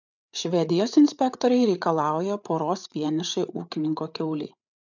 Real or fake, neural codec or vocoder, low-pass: fake; codec, 16 kHz, 8 kbps, FreqCodec, larger model; 7.2 kHz